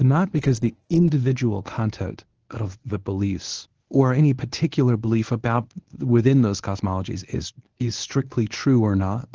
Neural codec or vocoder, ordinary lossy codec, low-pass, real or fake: codec, 24 kHz, 0.9 kbps, WavTokenizer, medium speech release version 1; Opus, 16 kbps; 7.2 kHz; fake